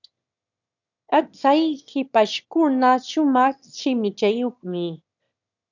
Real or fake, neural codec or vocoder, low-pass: fake; autoencoder, 22.05 kHz, a latent of 192 numbers a frame, VITS, trained on one speaker; 7.2 kHz